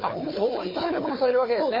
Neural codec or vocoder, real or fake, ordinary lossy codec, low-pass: codec, 16 kHz, 4 kbps, FunCodec, trained on Chinese and English, 50 frames a second; fake; MP3, 24 kbps; 5.4 kHz